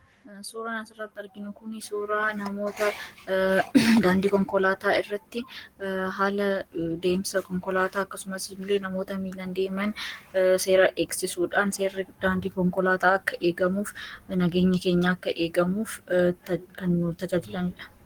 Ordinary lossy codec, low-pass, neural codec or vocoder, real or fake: Opus, 24 kbps; 19.8 kHz; codec, 44.1 kHz, 7.8 kbps, Pupu-Codec; fake